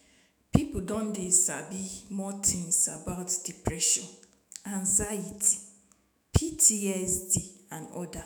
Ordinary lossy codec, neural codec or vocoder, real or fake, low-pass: none; autoencoder, 48 kHz, 128 numbers a frame, DAC-VAE, trained on Japanese speech; fake; none